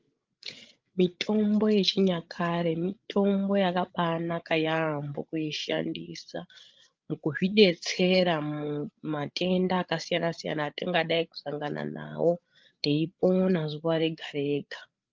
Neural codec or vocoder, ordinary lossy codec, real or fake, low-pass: codec, 16 kHz, 8 kbps, FreqCodec, larger model; Opus, 24 kbps; fake; 7.2 kHz